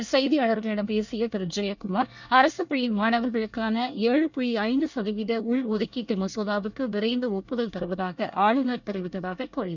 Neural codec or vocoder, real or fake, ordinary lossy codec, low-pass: codec, 24 kHz, 1 kbps, SNAC; fake; none; 7.2 kHz